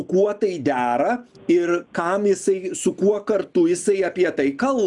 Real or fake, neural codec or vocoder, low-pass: real; none; 10.8 kHz